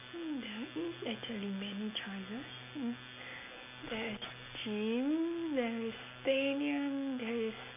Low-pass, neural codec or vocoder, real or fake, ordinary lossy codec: 3.6 kHz; none; real; none